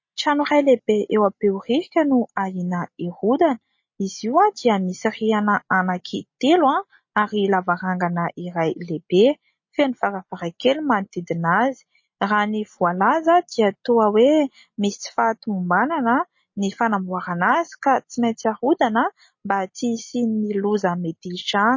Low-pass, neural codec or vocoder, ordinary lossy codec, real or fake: 7.2 kHz; none; MP3, 32 kbps; real